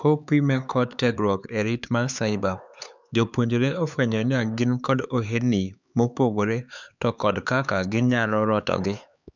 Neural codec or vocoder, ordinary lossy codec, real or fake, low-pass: codec, 16 kHz, 4 kbps, X-Codec, HuBERT features, trained on LibriSpeech; none; fake; 7.2 kHz